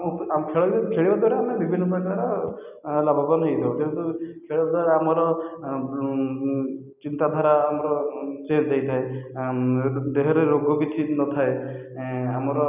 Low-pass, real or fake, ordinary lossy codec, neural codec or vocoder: 3.6 kHz; real; none; none